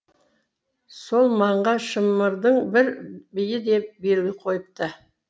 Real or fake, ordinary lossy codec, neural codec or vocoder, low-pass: real; none; none; none